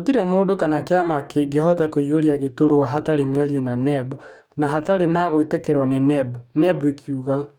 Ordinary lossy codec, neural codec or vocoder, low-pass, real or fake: none; codec, 44.1 kHz, 2.6 kbps, DAC; 19.8 kHz; fake